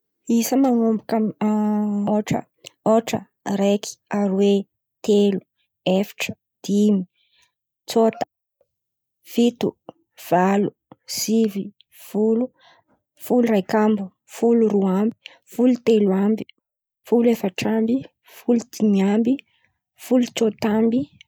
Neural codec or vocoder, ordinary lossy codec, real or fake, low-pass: none; none; real; none